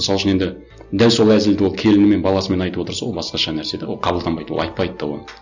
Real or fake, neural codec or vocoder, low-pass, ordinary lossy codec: real; none; 7.2 kHz; none